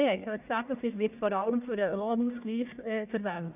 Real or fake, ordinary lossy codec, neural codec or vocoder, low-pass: fake; none; codec, 44.1 kHz, 1.7 kbps, Pupu-Codec; 3.6 kHz